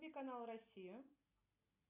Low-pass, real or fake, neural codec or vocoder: 3.6 kHz; real; none